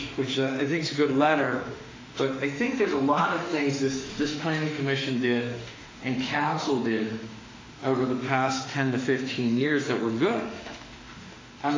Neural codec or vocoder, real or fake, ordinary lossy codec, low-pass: autoencoder, 48 kHz, 32 numbers a frame, DAC-VAE, trained on Japanese speech; fake; AAC, 32 kbps; 7.2 kHz